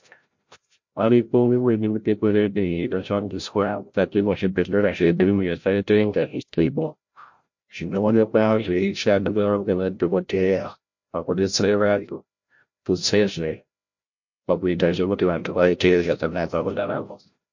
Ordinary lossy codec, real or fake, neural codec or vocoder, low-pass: MP3, 48 kbps; fake; codec, 16 kHz, 0.5 kbps, FreqCodec, larger model; 7.2 kHz